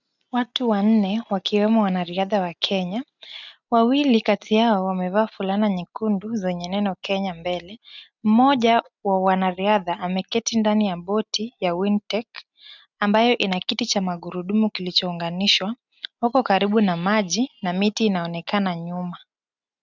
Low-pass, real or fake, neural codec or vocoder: 7.2 kHz; real; none